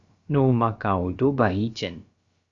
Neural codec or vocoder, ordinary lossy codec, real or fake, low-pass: codec, 16 kHz, about 1 kbps, DyCAST, with the encoder's durations; Opus, 64 kbps; fake; 7.2 kHz